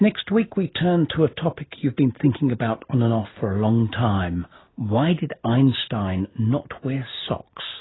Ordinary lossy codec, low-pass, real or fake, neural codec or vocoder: AAC, 16 kbps; 7.2 kHz; real; none